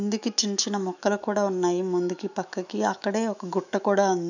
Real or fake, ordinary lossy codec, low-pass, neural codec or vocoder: fake; none; 7.2 kHz; autoencoder, 48 kHz, 128 numbers a frame, DAC-VAE, trained on Japanese speech